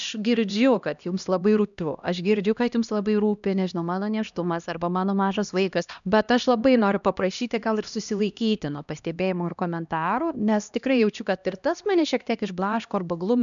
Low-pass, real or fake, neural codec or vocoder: 7.2 kHz; fake; codec, 16 kHz, 1 kbps, X-Codec, HuBERT features, trained on LibriSpeech